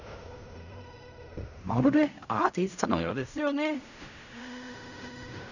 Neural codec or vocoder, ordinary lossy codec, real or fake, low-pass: codec, 16 kHz in and 24 kHz out, 0.4 kbps, LongCat-Audio-Codec, fine tuned four codebook decoder; none; fake; 7.2 kHz